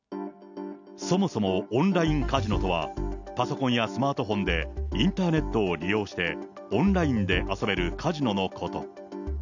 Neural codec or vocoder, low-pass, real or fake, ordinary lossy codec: none; 7.2 kHz; real; none